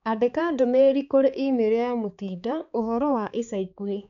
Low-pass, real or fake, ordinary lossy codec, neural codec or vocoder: 7.2 kHz; fake; none; codec, 16 kHz, 4 kbps, X-Codec, HuBERT features, trained on balanced general audio